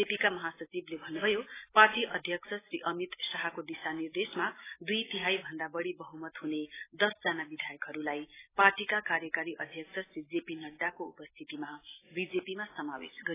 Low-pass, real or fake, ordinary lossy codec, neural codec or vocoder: 3.6 kHz; real; AAC, 16 kbps; none